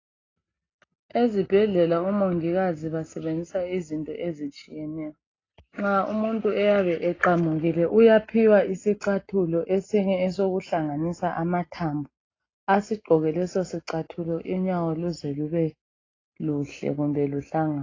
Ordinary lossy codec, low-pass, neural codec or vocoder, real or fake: AAC, 32 kbps; 7.2 kHz; none; real